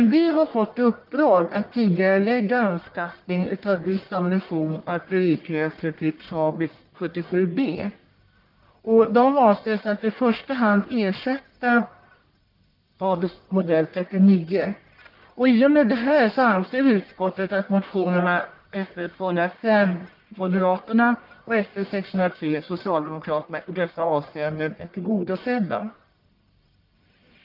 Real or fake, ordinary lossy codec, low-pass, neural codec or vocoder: fake; Opus, 24 kbps; 5.4 kHz; codec, 44.1 kHz, 1.7 kbps, Pupu-Codec